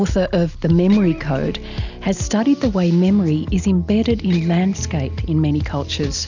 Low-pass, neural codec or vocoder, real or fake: 7.2 kHz; none; real